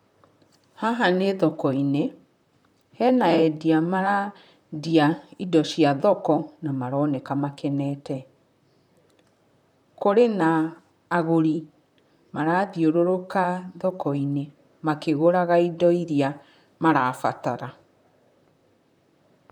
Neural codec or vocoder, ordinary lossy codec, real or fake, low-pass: vocoder, 44.1 kHz, 128 mel bands, Pupu-Vocoder; none; fake; 19.8 kHz